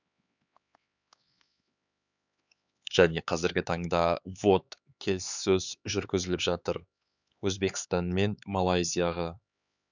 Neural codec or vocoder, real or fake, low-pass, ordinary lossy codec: codec, 16 kHz, 4 kbps, X-Codec, HuBERT features, trained on LibriSpeech; fake; 7.2 kHz; none